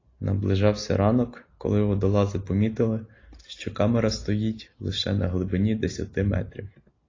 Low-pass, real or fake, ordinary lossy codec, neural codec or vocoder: 7.2 kHz; real; AAC, 32 kbps; none